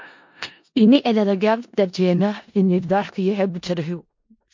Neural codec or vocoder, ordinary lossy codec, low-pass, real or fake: codec, 16 kHz in and 24 kHz out, 0.4 kbps, LongCat-Audio-Codec, four codebook decoder; MP3, 48 kbps; 7.2 kHz; fake